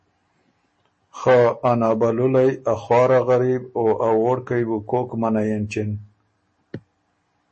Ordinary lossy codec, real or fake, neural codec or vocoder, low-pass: MP3, 32 kbps; real; none; 10.8 kHz